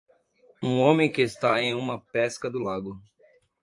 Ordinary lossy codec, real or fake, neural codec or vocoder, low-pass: AAC, 64 kbps; fake; vocoder, 44.1 kHz, 128 mel bands, Pupu-Vocoder; 10.8 kHz